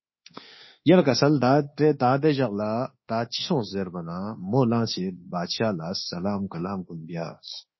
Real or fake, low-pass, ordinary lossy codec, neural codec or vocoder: fake; 7.2 kHz; MP3, 24 kbps; codec, 24 kHz, 1.2 kbps, DualCodec